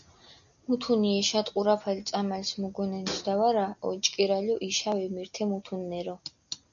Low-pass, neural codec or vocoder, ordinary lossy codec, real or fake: 7.2 kHz; none; MP3, 96 kbps; real